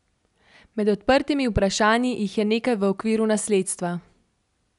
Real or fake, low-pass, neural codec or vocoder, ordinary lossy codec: real; 10.8 kHz; none; none